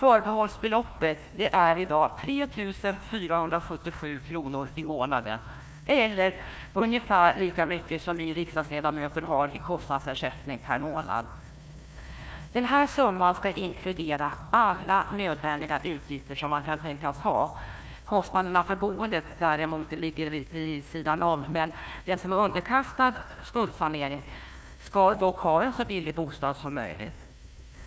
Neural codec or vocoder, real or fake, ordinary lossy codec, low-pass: codec, 16 kHz, 1 kbps, FunCodec, trained on Chinese and English, 50 frames a second; fake; none; none